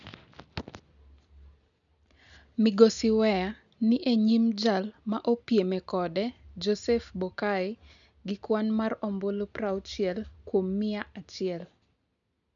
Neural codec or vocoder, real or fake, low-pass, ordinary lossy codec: none; real; 7.2 kHz; none